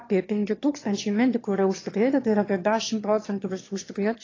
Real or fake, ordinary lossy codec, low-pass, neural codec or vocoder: fake; AAC, 32 kbps; 7.2 kHz; autoencoder, 22.05 kHz, a latent of 192 numbers a frame, VITS, trained on one speaker